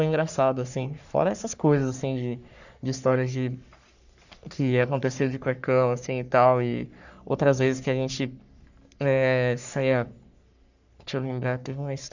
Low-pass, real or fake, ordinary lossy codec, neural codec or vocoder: 7.2 kHz; fake; none; codec, 44.1 kHz, 3.4 kbps, Pupu-Codec